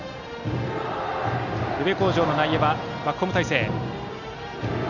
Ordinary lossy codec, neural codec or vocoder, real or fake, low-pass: none; none; real; 7.2 kHz